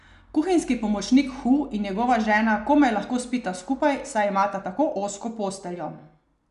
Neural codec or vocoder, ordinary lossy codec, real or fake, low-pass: none; none; real; 10.8 kHz